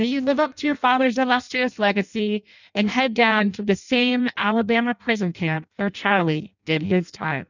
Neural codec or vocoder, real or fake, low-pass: codec, 16 kHz in and 24 kHz out, 0.6 kbps, FireRedTTS-2 codec; fake; 7.2 kHz